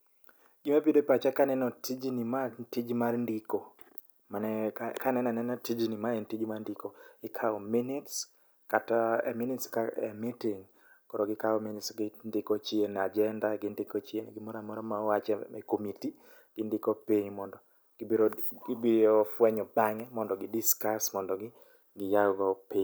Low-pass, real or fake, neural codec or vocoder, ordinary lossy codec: none; real; none; none